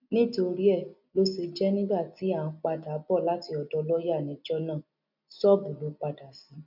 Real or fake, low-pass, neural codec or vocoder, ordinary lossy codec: real; 5.4 kHz; none; none